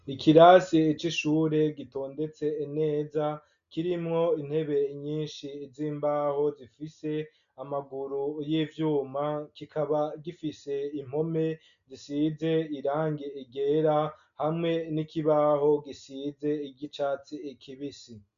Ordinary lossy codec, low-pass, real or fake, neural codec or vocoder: MP3, 96 kbps; 7.2 kHz; real; none